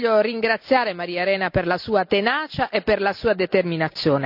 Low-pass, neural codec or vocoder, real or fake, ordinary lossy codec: 5.4 kHz; none; real; none